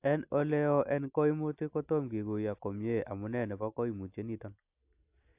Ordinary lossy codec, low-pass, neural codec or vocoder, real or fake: AAC, 32 kbps; 3.6 kHz; none; real